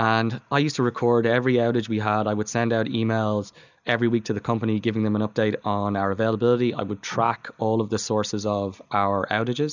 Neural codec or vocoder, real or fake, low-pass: none; real; 7.2 kHz